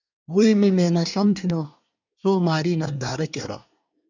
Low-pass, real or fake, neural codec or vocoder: 7.2 kHz; fake; codec, 24 kHz, 1 kbps, SNAC